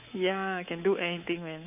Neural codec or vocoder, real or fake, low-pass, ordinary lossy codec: codec, 24 kHz, 3.1 kbps, DualCodec; fake; 3.6 kHz; AAC, 32 kbps